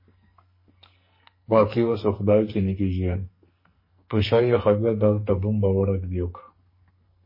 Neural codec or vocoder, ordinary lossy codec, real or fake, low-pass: codec, 44.1 kHz, 2.6 kbps, SNAC; MP3, 24 kbps; fake; 5.4 kHz